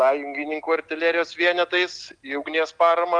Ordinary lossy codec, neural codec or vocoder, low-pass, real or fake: Opus, 24 kbps; none; 9.9 kHz; real